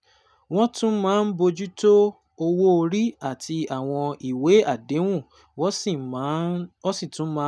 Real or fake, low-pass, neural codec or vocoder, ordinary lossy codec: real; none; none; none